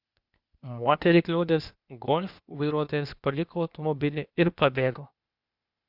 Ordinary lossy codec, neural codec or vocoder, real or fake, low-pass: Opus, 64 kbps; codec, 16 kHz, 0.8 kbps, ZipCodec; fake; 5.4 kHz